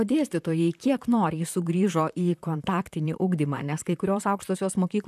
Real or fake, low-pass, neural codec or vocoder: fake; 14.4 kHz; vocoder, 44.1 kHz, 128 mel bands, Pupu-Vocoder